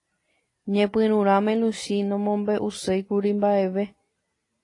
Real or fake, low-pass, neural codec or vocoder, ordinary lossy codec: real; 10.8 kHz; none; AAC, 32 kbps